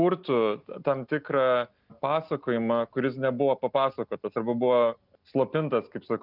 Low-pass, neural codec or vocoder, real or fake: 5.4 kHz; none; real